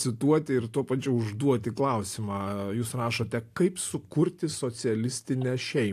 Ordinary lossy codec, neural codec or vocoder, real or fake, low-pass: AAC, 64 kbps; none; real; 14.4 kHz